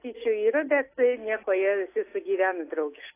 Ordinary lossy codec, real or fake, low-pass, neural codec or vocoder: AAC, 24 kbps; real; 3.6 kHz; none